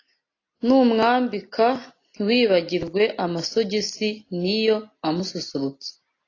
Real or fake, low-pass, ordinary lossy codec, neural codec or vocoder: real; 7.2 kHz; AAC, 32 kbps; none